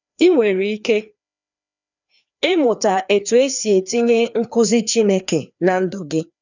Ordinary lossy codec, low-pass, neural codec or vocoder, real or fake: none; 7.2 kHz; codec, 16 kHz, 2 kbps, FreqCodec, larger model; fake